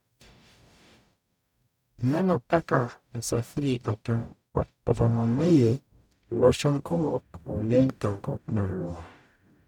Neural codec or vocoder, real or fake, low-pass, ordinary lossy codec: codec, 44.1 kHz, 0.9 kbps, DAC; fake; 19.8 kHz; none